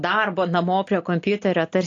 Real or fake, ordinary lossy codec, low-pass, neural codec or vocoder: real; AAC, 48 kbps; 7.2 kHz; none